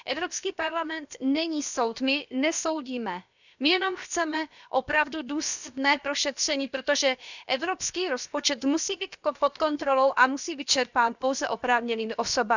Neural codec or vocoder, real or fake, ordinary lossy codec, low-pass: codec, 16 kHz, about 1 kbps, DyCAST, with the encoder's durations; fake; none; 7.2 kHz